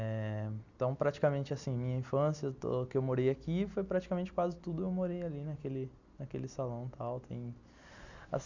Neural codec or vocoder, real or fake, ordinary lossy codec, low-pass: none; real; none; 7.2 kHz